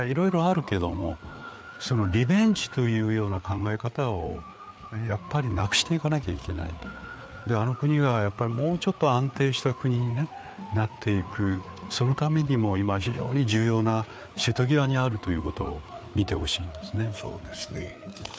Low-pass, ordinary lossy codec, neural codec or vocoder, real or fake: none; none; codec, 16 kHz, 4 kbps, FreqCodec, larger model; fake